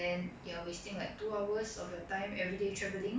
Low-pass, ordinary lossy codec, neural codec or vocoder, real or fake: none; none; none; real